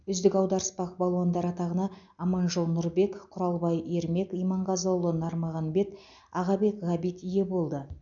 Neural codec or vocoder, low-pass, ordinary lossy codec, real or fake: none; 7.2 kHz; none; real